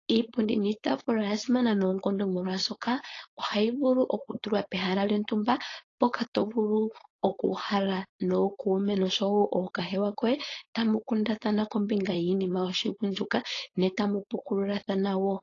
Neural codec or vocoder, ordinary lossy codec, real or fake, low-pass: codec, 16 kHz, 4.8 kbps, FACodec; AAC, 32 kbps; fake; 7.2 kHz